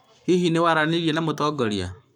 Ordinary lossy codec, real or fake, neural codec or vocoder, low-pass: none; fake; codec, 44.1 kHz, 7.8 kbps, Pupu-Codec; 19.8 kHz